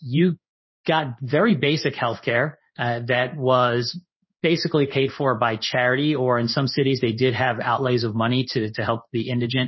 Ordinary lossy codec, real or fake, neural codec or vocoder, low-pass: MP3, 24 kbps; fake; codec, 16 kHz in and 24 kHz out, 1 kbps, XY-Tokenizer; 7.2 kHz